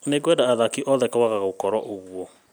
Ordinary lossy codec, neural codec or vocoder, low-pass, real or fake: none; none; none; real